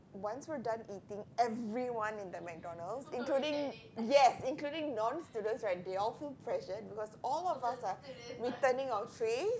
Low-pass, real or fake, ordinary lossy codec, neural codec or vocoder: none; real; none; none